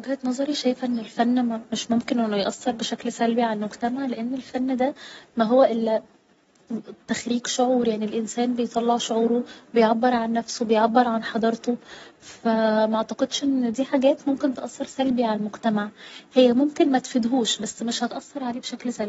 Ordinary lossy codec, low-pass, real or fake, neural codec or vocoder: AAC, 24 kbps; 19.8 kHz; real; none